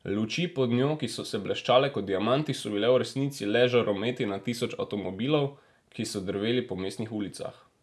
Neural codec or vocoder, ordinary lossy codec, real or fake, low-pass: none; none; real; none